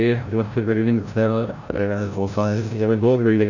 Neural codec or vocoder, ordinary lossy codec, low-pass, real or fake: codec, 16 kHz, 0.5 kbps, FreqCodec, larger model; none; 7.2 kHz; fake